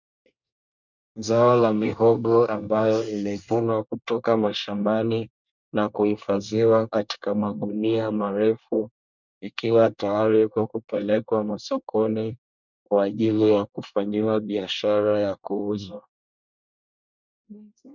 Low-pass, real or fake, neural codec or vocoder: 7.2 kHz; fake; codec, 24 kHz, 1 kbps, SNAC